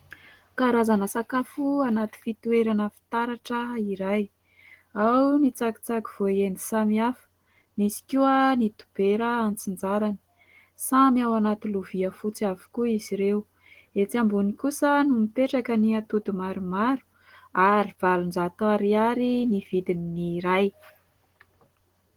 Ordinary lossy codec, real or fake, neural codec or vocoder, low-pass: Opus, 16 kbps; real; none; 19.8 kHz